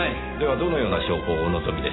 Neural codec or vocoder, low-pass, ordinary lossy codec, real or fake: none; 7.2 kHz; AAC, 16 kbps; real